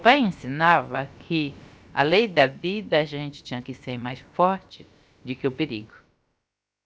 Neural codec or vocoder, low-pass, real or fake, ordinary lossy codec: codec, 16 kHz, about 1 kbps, DyCAST, with the encoder's durations; none; fake; none